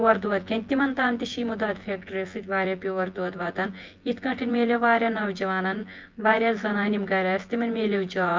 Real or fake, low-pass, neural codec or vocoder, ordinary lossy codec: fake; 7.2 kHz; vocoder, 24 kHz, 100 mel bands, Vocos; Opus, 24 kbps